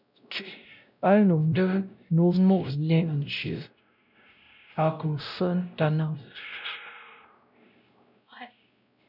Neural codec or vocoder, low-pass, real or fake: codec, 16 kHz, 0.5 kbps, X-Codec, WavLM features, trained on Multilingual LibriSpeech; 5.4 kHz; fake